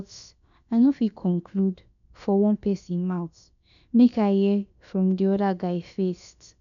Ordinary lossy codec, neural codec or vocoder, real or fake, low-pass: none; codec, 16 kHz, about 1 kbps, DyCAST, with the encoder's durations; fake; 7.2 kHz